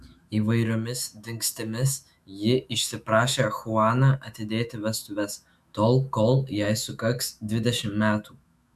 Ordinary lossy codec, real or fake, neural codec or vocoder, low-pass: MP3, 96 kbps; fake; autoencoder, 48 kHz, 128 numbers a frame, DAC-VAE, trained on Japanese speech; 14.4 kHz